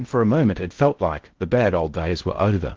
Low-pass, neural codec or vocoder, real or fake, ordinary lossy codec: 7.2 kHz; codec, 16 kHz in and 24 kHz out, 0.6 kbps, FocalCodec, streaming, 2048 codes; fake; Opus, 16 kbps